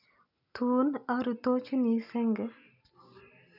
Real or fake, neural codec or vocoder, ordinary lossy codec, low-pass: real; none; none; 5.4 kHz